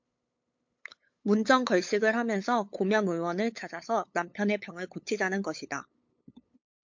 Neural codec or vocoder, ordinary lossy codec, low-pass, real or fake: codec, 16 kHz, 8 kbps, FunCodec, trained on LibriTTS, 25 frames a second; MP3, 48 kbps; 7.2 kHz; fake